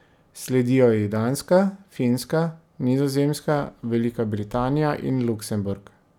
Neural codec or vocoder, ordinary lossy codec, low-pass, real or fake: none; none; 19.8 kHz; real